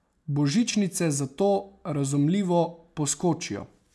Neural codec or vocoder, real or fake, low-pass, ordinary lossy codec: none; real; none; none